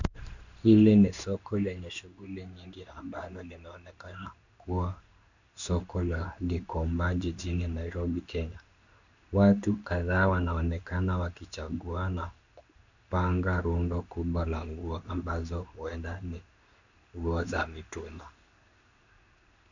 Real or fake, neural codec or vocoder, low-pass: fake; codec, 16 kHz in and 24 kHz out, 1 kbps, XY-Tokenizer; 7.2 kHz